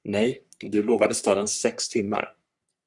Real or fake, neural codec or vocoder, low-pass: fake; codec, 44.1 kHz, 3.4 kbps, Pupu-Codec; 10.8 kHz